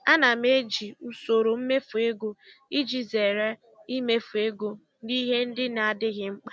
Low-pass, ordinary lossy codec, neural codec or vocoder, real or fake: none; none; none; real